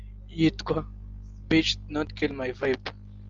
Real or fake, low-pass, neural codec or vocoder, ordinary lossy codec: real; 7.2 kHz; none; Opus, 32 kbps